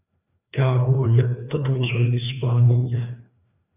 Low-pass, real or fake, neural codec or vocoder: 3.6 kHz; fake; codec, 16 kHz, 2 kbps, FreqCodec, larger model